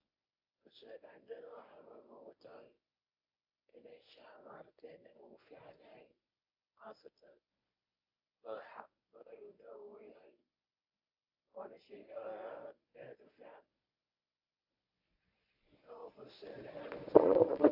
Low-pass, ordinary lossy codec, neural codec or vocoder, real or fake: 5.4 kHz; AAC, 48 kbps; codec, 24 kHz, 0.9 kbps, WavTokenizer, medium speech release version 1; fake